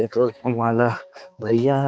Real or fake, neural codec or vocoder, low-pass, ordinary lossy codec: fake; codec, 16 kHz, 2 kbps, X-Codec, HuBERT features, trained on balanced general audio; none; none